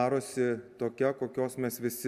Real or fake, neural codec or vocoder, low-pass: real; none; 14.4 kHz